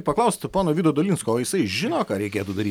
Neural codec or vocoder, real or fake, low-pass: none; real; 19.8 kHz